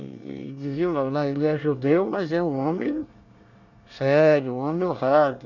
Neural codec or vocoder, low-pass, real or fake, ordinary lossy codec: codec, 24 kHz, 1 kbps, SNAC; 7.2 kHz; fake; none